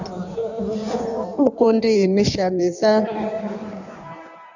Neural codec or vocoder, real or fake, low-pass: codec, 16 kHz in and 24 kHz out, 1.1 kbps, FireRedTTS-2 codec; fake; 7.2 kHz